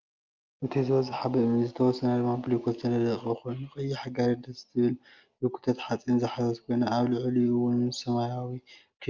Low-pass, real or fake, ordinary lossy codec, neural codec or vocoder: 7.2 kHz; real; Opus, 24 kbps; none